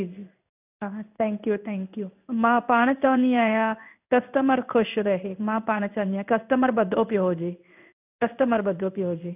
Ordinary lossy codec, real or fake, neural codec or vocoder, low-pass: none; fake; codec, 16 kHz in and 24 kHz out, 1 kbps, XY-Tokenizer; 3.6 kHz